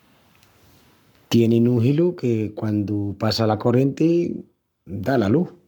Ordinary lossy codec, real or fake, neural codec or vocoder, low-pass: none; fake; codec, 44.1 kHz, 7.8 kbps, Pupu-Codec; 19.8 kHz